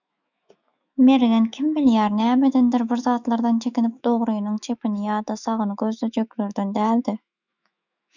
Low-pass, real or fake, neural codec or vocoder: 7.2 kHz; fake; autoencoder, 48 kHz, 128 numbers a frame, DAC-VAE, trained on Japanese speech